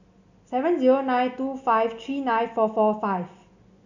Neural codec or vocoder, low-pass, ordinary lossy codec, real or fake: none; 7.2 kHz; none; real